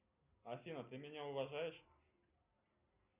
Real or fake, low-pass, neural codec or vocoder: real; 3.6 kHz; none